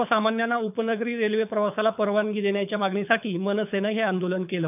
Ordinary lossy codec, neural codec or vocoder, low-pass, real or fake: none; codec, 24 kHz, 6 kbps, HILCodec; 3.6 kHz; fake